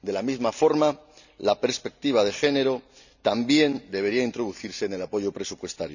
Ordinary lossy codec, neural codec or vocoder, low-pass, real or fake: none; none; 7.2 kHz; real